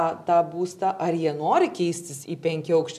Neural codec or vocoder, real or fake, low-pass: none; real; 14.4 kHz